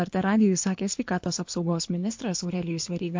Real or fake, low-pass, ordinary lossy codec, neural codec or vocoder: fake; 7.2 kHz; MP3, 48 kbps; codec, 24 kHz, 6 kbps, HILCodec